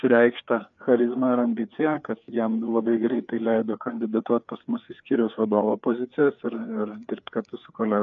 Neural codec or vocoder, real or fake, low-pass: codec, 16 kHz, 4 kbps, FreqCodec, larger model; fake; 7.2 kHz